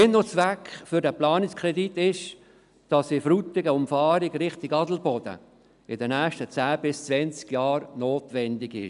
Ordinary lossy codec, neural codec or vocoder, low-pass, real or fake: none; none; 10.8 kHz; real